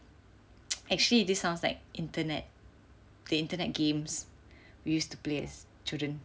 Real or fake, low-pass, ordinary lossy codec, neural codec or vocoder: real; none; none; none